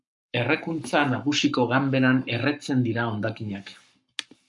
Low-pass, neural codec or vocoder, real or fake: 10.8 kHz; codec, 44.1 kHz, 7.8 kbps, Pupu-Codec; fake